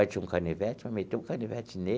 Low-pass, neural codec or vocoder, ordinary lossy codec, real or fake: none; none; none; real